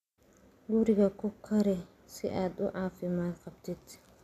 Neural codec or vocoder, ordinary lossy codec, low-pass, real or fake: none; none; 14.4 kHz; real